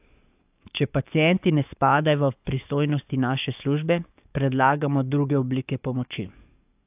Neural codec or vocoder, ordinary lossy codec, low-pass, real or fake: codec, 44.1 kHz, 7.8 kbps, DAC; AAC, 32 kbps; 3.6 kHz; fake